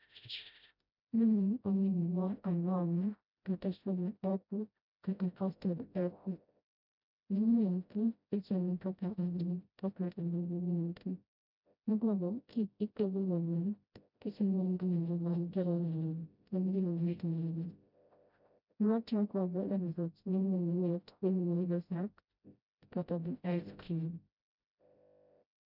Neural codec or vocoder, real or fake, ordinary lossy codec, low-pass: codec, 16 kHz, 0.5 kbps, FreqCodec, smaller model; fake; none; 5.4 kHz